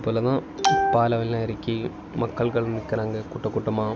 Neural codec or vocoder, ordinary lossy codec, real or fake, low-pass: none; none; real; none